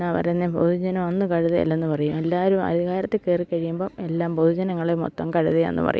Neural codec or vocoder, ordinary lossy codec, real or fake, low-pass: none; none; real; none